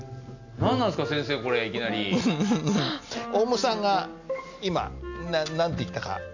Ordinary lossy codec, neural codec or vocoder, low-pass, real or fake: none; none; 7.2 kHz; real